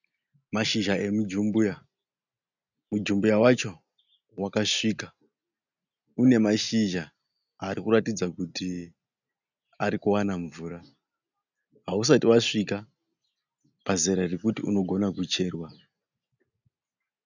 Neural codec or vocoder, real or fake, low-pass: none; real; 7.2 kHz